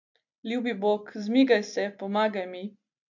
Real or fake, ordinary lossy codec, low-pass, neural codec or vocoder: real; none; 7.2 kHz; none